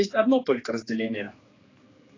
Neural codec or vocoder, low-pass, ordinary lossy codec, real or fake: codec, 16 kHz, 2 kbps, X-Codec, HuBERT features, trained on general audio; 7.2 kHz; AAC, 32 kbps; fake